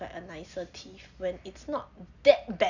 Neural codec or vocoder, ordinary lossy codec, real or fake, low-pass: none; none; real; 7.2 kHz